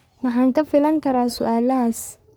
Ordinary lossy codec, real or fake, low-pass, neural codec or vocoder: none; fake; none; codec, 44.1 kHz, 3.4 kbps, Pupu-Codec